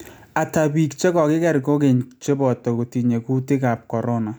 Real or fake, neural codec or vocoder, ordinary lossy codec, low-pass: real; none; none; none